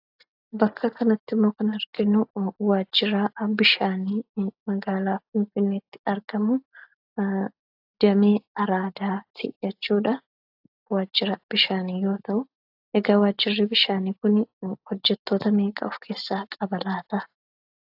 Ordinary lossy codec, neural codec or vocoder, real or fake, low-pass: AAC, 48 kbps; none; real; 5.4 kHz